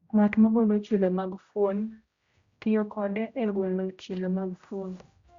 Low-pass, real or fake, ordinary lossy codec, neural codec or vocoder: 7.2 kHz; fake; Opus, 64 kbps; codec, 16 kHz, 0.5 kbps, X-Codec, HuBERT features, trained on general audio